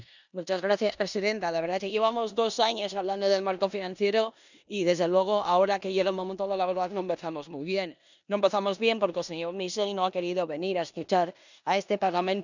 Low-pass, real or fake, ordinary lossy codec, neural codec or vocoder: 7.2 kHz; fake; none; codec, 16 kHz in and 24 kHz out, 0.9 kbps, LongCat-Audio-Codec, four codebook decoder